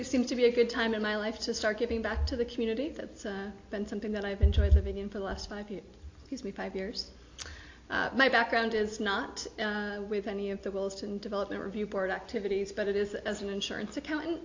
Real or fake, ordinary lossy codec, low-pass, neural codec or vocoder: real; AAC, 48 kbps; 7.2 kHz; none